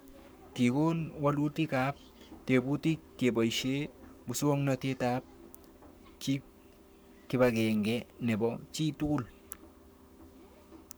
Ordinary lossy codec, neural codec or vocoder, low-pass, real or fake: none; codec, 44.1 kHz, 7.8 kbps, Pupu-Codec; none; fake